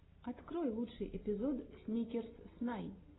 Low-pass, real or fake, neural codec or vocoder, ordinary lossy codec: 7.2 kHz; real; none; AAC, 16 kbps